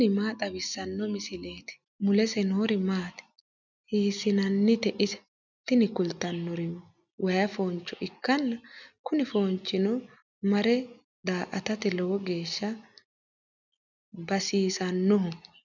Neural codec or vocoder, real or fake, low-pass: none; real; 7.2 kHz